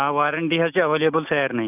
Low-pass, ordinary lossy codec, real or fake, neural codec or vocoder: 3.6 kHz; none; real; none